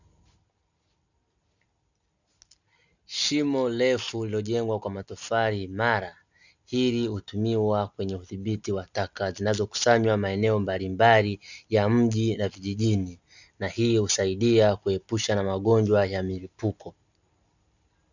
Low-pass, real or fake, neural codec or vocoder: 7.2 kHz; real; none